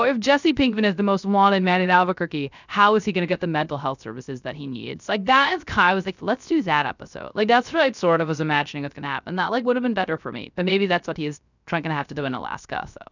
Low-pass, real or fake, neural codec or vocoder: 7.2 kHz; fake; codec, 16 kHz, 0.3 kbps, FocalCodec